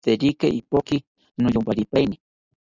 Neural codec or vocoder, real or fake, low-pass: none; real; 7.2 kHz